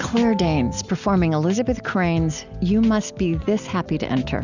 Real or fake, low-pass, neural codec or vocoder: real; 7.2 kHz; none